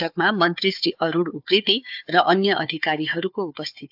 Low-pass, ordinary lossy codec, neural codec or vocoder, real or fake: 5.4 kHz; AAC, 48 kbps; codec, 16 kHz, 4 kbps, FunCodec, trained on Chinese and English, 50 frames a second; fake